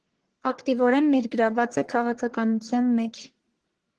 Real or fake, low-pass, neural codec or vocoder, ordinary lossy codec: fake; 10.8 kHz; codec, 44.1 kHz, 1.7 kbps, Pupu-Codec; Opus, 16 kbps